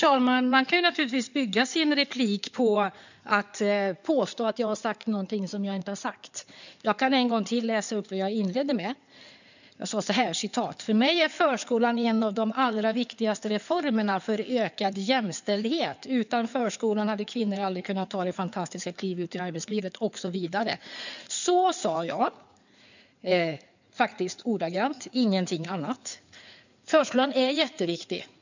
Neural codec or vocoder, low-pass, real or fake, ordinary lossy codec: codec, 16 kHz in and 24 kHz out, 2.2 kbps, FireRedTTS-2 codec; 7.2 kHz; fake; none